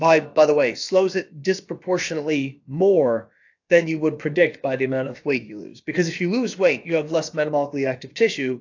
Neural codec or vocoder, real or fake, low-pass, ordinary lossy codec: codec, 16 kHz, about 1 kbps, DyCAST, with the encoder's durations; fake; 7.2 kHz; AAC, 48 kbps